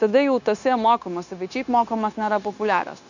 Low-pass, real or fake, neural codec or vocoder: 7.2 kHz; fake; codec, 16 kHz, 0.9 kbps, LongCat-Audio-Codec